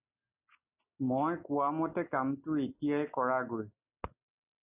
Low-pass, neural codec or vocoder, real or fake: 3.6 kHz; none; real